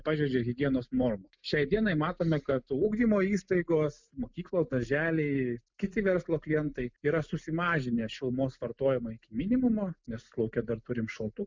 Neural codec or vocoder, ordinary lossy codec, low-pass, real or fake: none; MP3, 64 kbps; 7.2 kHz; real